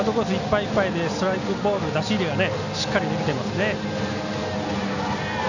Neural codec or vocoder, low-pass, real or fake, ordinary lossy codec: none; 7.2 kHz; real; none